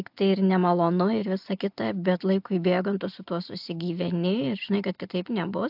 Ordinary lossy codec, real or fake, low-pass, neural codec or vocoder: AAC, 48 kbps; real; 5.4 kHz; none